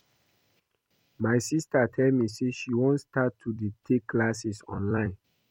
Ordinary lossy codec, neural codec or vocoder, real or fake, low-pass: MP3, 64 kbps; vocoder, 44.1 kHz, 128 mel bands every 256 samples, BigVGAN v2; fake; 19.8 kHz